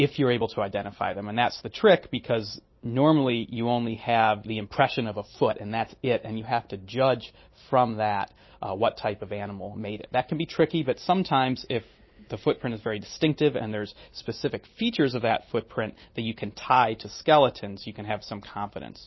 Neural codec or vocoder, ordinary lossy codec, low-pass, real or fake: none; MP3, 24 kbps; 7.2 kHz; real